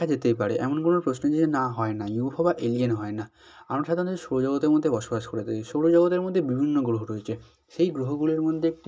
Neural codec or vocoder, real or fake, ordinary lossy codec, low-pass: none; real; none; none